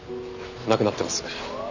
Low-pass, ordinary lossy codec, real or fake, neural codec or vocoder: 7.2 kHz; none; real; none